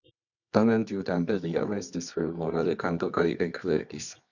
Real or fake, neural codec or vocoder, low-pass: fake; codec, 24 kHz, 0.9 kbps, WavTokenizer, medium music audio release; 7.2 kHz